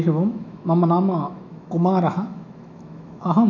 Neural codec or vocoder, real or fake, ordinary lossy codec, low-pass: autoencoder, 48 kHz, 128 numbers a frame, DAC-VAE, trained on Japanese speech; fake; none; 7.2 kHz